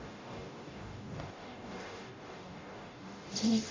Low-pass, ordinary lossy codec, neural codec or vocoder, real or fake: 7.2 kHz; AAC, 32 kbps; codec, 44.1 kHz, 0.9 kbps, DAC; fake